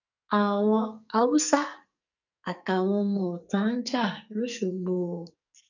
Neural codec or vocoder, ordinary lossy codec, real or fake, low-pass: codec, 44.1 kHz, 2.6 kbps, SNAC; none; fake; 7.2 kHz